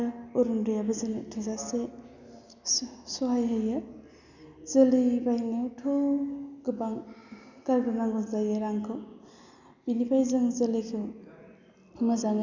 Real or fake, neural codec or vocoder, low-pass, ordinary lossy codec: real; none; 7.2 kHz; none